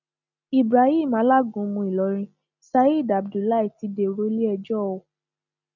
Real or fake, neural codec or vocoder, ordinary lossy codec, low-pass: real; none; none; 7.2 kHz